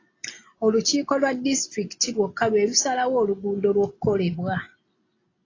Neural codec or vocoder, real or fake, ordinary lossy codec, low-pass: none; real; AAC, 32 kbps; 7.2 kHz